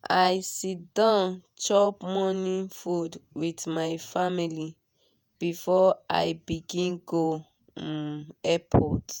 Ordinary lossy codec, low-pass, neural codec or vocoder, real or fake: none; none; vocoder, 48 kHz, 128 mel bands, Vocos; fake